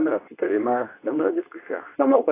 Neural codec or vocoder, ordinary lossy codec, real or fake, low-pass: codec, 24 kHz, 0.9 kbps, WavTokenizer, medium speech release version 2; AAC, 24 kbps; fake; 3.6 kHz